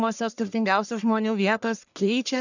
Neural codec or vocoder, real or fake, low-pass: codec, 44.1 kHz, 1.7 kbps, Pupu-Codec; fake; 7.2 kHz